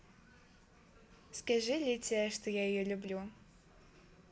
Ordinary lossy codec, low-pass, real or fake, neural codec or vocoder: none; none; real; none